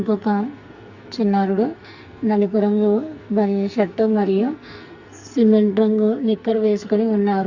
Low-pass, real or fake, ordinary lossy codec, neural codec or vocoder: 7.2 kHz; fake; Opus, 64 kbps; codec, 44.1 kHz, 2.6 kbps, SNAC